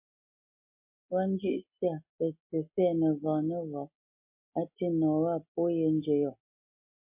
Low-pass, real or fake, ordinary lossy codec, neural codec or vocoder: 3.6 kHz; real; MP3, 24 kbps; none